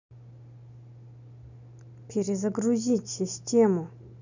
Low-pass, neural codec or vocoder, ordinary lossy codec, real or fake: 7.2 kHz; none; none; real